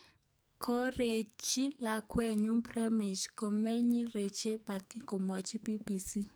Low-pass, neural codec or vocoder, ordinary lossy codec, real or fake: none; codec, 44.1 kHz, 2.6 kbps, SNAC; none; fake